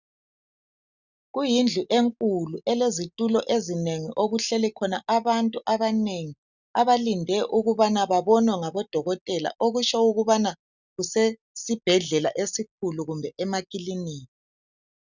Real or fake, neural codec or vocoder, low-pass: real; none; 7.2 kHz